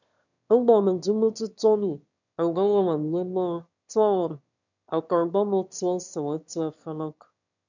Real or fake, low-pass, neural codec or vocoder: fake; 7.2 kHz; autoencoder, 22.05 kHz, a latent of 192 numbers a frame, VITS, trained on one speaker